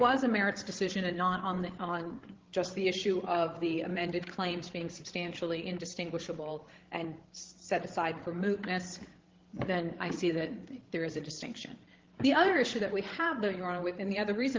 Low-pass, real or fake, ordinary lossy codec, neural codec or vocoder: 7.2 kHz; fake; Opus, 16 kbps; codec, 16 kHz, 16 kbps, FreqCodec, larger model